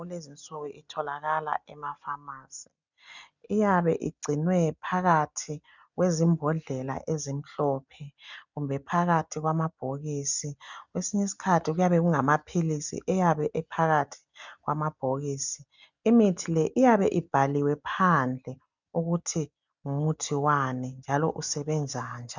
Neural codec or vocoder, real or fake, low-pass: none; real; 7.2 kHz